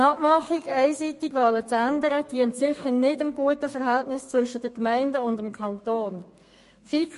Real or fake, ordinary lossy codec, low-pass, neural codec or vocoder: fake; MP3, 48 kbps; 14.4 kHz; codec, 32 kHz, 1.9 kbps, SNAC